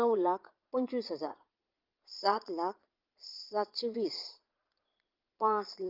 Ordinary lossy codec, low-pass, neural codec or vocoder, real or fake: Opus, 24 kbps; 5.4 kHz; vocoder, 22.05 kHz, 80 mel bands, Vocos; fake